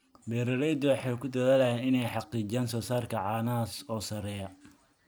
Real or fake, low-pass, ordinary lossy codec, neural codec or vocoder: fake; none; none; vocoder, 44.1 kHz, 128 mel bands every 512 samples, BigVGAN v2